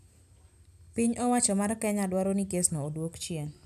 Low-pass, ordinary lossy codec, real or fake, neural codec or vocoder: 14.4 kHz; none; real; none